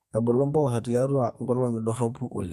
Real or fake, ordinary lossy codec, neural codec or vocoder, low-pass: fake; none; codec, 32 kHz, 1.9 kbps, SNAC; 14.4 kHz